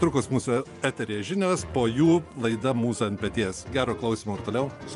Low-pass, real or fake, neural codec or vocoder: 10.8 kHz; fake; vocoder, 24 kHz, 100 mel bands, Vocos